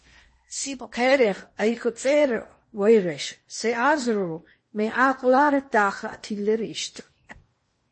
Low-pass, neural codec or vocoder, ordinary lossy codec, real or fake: 9.9 kHz; codec, 16 kHz in and 24 kHz out, 0.8 kbps, FocalCodec, streaming, 65536 codes; MP3, 32 kbps; fake